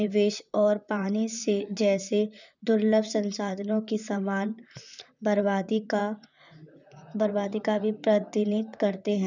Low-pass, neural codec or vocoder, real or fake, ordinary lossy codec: 7.2 kHz; vocoder, 44.1 kHz, 128 mel bands, Pupu-Vocoder; fake; none